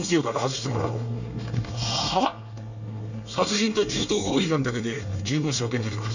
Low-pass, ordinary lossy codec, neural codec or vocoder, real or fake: 7.2 kHz; none; codec, 24 kHz, 1 kbps, SNAC; fake